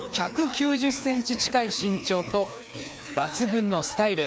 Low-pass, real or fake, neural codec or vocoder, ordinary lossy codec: none; fake; codec, 16 kHz, 2 kbps, FreqCodec, larger model; none